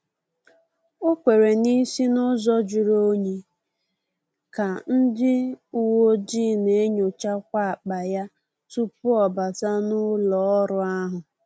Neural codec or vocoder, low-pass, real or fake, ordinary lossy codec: none; none; real; none